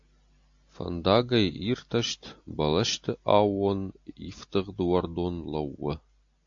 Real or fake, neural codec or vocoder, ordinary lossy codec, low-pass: real; none; AAC, 64 kbps; 7.2 kHz